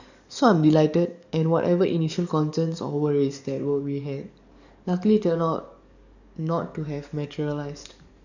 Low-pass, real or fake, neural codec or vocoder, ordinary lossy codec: 7.2 kHz; fake; codec, 44.1 kHz, 7.8 kbps, DAC; none